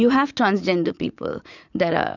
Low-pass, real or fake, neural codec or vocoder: 7.2 kHz; fake; vocoder, 44.1 kHz, 80 mel bands, Vocos